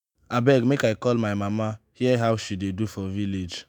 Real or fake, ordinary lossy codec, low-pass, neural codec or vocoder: fake; none; none; autoencoder, 48 kHz, 128 numbers a frame, DAC-VAE, trained on Japanese speech